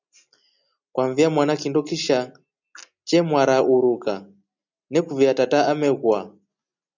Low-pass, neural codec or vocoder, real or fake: 7.2 kHz; none; real